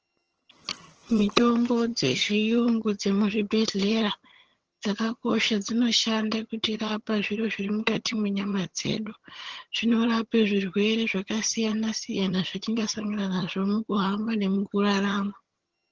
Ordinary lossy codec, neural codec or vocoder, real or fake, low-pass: Opus, 16 kbps; vocoder, 22.05 kHz, 80 mel bands, HiFi-GAN; fake; 7.2 kHz